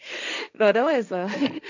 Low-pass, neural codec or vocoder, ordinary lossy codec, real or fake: 7.2 kHz; codec, 16 kHz, 1.1 kbps, Voila-Tokenizer; none; fake